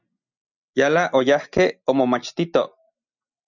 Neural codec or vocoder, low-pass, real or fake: none; 7.2 kHz; real